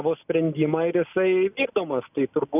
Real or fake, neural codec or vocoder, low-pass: real; none; 3.6 kHz